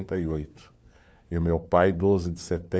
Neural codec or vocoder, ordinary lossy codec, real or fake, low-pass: codec, 16 kHz, 4 kbps, FunCodec, trained on LibriTTS, 50 frames a second; none; fake; none